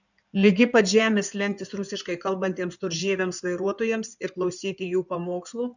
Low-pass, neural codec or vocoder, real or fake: 7.2 kHz; codec, 16 kHz in and 24 kHz out, 2.2 kbps, FireRedTTS-2 codec; fake